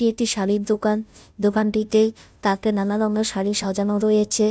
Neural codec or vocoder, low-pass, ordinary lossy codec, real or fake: codec, 16 kHz, 0.5 kbps, FunCodec, trained on Chinese and English, 25 frames a second; none; none; fake